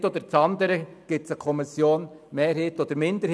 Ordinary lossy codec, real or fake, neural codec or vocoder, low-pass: none; real; none; none